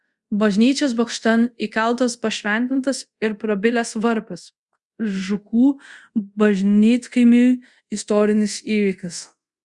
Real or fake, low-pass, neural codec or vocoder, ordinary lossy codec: fake; 10.8 kHz; codec, 24 kHz, 0.5 kbps, DualCodec; Opus, 64 kbps